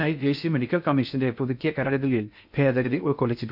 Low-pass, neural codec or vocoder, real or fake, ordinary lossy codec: 5.4 kHz; codec, 16 kHz in and 24 kHz out, 0.6 kbps, FocalCodec, streaming, 2048 codes; fake; none